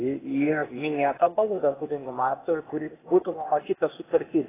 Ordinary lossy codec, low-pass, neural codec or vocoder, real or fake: AAC, 16 kbps; 3.6 kHz; codec, 16 kHz, 0.8 kbps, ZipCodec; fake